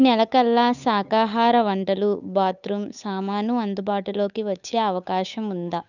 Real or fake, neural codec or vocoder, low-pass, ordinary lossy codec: fake; codec, 16 kHz, 8 kbps, FunCodec, trained on Chinese and English, 25 frames a second; 7.2 kHz; none